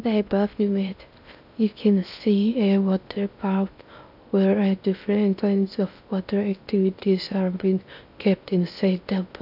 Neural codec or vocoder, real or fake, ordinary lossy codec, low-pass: codec, 16 kHz in and 24 kHz out, 0.6 kbps, FocalCodec, streaming, 2048 codes; fake; none; 5.4 kHz